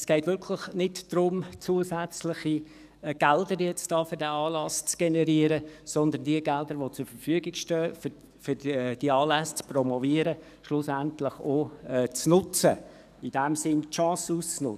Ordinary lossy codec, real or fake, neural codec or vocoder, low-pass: none; fake; codec, 44.1 kHz, 7.8 kbps, DAC; 14.4 kHz